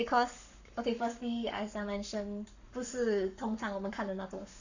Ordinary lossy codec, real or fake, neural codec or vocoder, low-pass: none; fake; codec, 44.1 kHz, 7.8 kbps, Pupu-Codec; 7.2 kHz